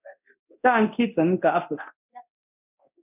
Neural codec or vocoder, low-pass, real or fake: codec, 24 kHz, 0.9 kbps, DualCodec; 3.6 kHz; fake